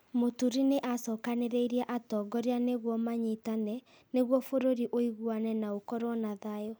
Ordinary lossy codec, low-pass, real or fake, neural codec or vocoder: none; none; real; none